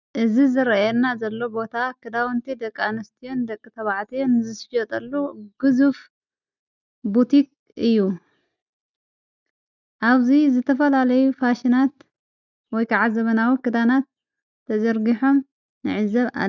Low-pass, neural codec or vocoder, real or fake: 7.2 kHz; none; real